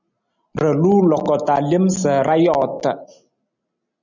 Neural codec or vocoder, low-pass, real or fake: none; 7.2 kHz; real